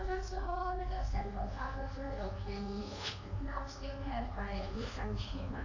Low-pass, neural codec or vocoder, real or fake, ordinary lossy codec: 7.2 kHz; codec, 24 kHz, 1.2 kbps, DualCodec; fake; AAC, 48 kbps